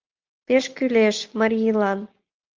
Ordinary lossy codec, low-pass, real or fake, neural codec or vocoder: Opus, 32 kbps; 7.2 kHz; real; none